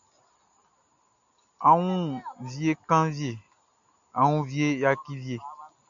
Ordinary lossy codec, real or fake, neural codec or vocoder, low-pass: MP3, 64 kbps; real; none; 7.2 kHz